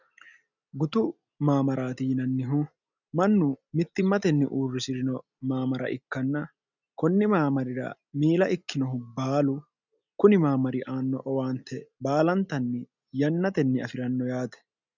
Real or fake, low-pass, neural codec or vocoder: real; 7.2 kHz; none